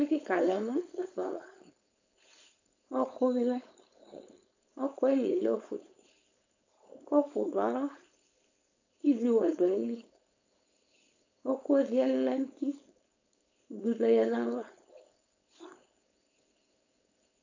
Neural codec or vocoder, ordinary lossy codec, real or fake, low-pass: codec, 16 kHz, 4.8 kbps, FACodec; AAC, 48 kbps; fake; 7.2 kHz